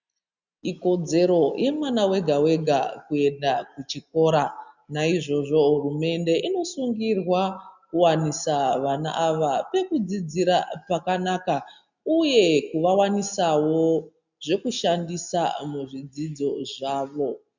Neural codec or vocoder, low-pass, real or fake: none; 7.2 kHz; real